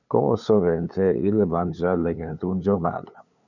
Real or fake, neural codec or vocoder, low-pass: fake; codec, 16 kHz, 8 kbps, FunCodec, trained on LibriTTS, 25 frames a second; 7.2 kHz